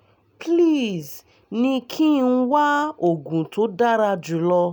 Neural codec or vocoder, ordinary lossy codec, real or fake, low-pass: none; none; real; none